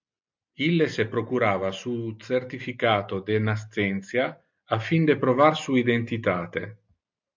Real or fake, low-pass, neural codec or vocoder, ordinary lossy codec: real; 7.2 kHz; none; MP3, 64 kbps